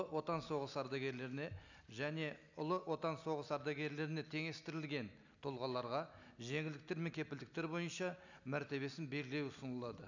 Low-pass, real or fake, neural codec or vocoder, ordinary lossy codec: 7.2 kHz; real; none; none